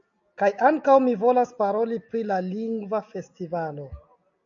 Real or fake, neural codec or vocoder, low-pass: real; none; 7.2 kHz